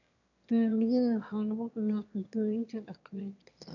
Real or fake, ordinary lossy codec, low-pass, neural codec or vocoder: fake; none; 7.2 kHz; autoencoder, 22.05 kHz, a latent of 192 numbers a frame, VITS, trained on one speaker